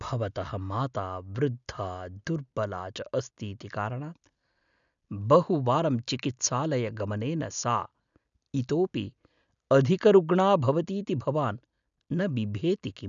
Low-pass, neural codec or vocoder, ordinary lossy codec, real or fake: 7.2 kHz; none; none; real